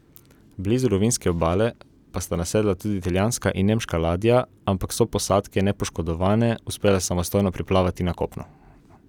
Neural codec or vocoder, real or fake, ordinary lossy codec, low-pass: none; real; none; 19.8 kHz